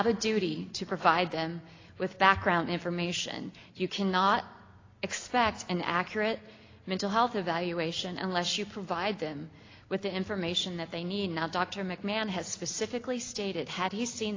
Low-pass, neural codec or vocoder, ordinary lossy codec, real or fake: 7.2 kHz; none; AAC, 32 kbps; real